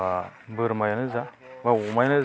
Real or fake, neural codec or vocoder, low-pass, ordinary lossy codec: real; none; none; none